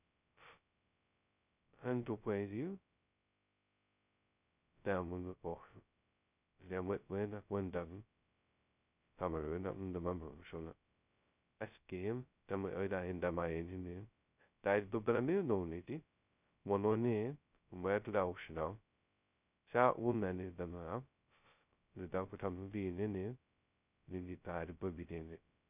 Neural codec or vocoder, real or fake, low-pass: codec, 16 kHz, 0.2 kbps, FocalCodec; fake; 3.6 kHz